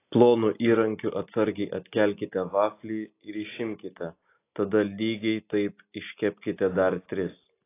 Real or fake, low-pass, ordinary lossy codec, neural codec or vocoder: real; 3.6 kHz; AAC, 24 kbps; none